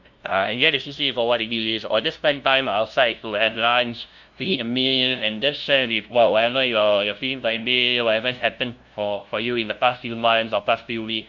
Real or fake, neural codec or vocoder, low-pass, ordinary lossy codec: fake; codec, 16 kHz, 0.5 kbps, FunCodec, trained on LibriTTS, 25 frames a second; 7.2 kHz; Opus, 64 kbps